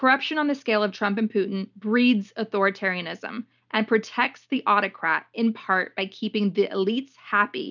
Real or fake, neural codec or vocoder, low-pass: real; none; 7.2 kHz